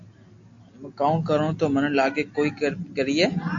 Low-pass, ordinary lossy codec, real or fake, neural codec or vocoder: 7.2 kHz; AAC, 48 kbps; real; none